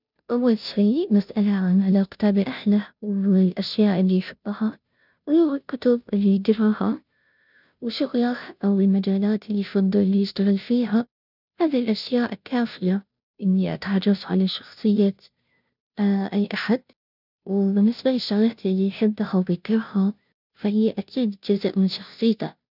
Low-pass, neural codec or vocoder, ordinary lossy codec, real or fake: 5.4 kHz; codec, 16 kHz, 0.5 kbps, FunCodec, trained on Chinese and English, 25 frames a second; none; fake